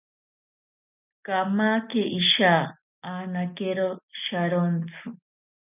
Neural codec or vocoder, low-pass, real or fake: none; 3.6 kHz; real